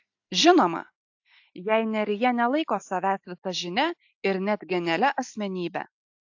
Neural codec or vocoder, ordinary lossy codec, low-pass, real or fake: none; AAC, 48 kbps; 7.2 kHz; real